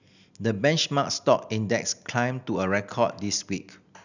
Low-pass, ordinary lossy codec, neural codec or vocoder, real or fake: 7.2 kHz; none; none; real